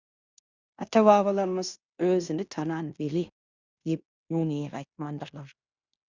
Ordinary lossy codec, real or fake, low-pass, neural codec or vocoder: Opus, 64 kbps; fake; 7.2 kHz; codec, 16 kHz in and 24 kHz out, 0.9 kbps, LongCat-Audio-Codec, fine tuned four codebook decoder